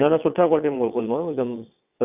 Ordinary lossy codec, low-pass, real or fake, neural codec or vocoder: none; 3.6 kHz; fake; vocoder, 22.05 kHz, 80 mel bands, WaveNeXt